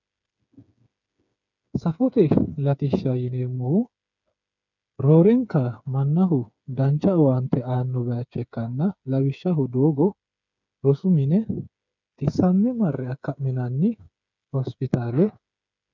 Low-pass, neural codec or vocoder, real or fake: 7.2 kHz; codec, 16 kHz, 8 kbps, FreqCodec, smaller model; fake